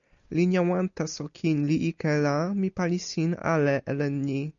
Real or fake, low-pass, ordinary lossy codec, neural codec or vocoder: real; 7.2 kHz; MP3, 96 kbps; none